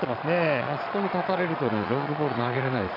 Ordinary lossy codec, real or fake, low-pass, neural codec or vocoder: none; fake; 5.4 kHz; vocoder, 22.05 kHz, 80 mel bands, Vocos